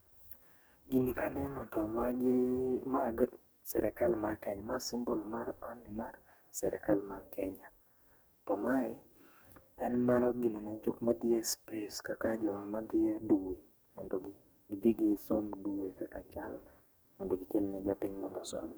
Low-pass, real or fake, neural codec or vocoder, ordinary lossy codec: none; fake; codec, 44.1 kHz, 2.6 kbps, DAC; none